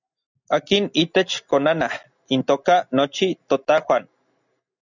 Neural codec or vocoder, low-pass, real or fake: none; 7.2 kHz; real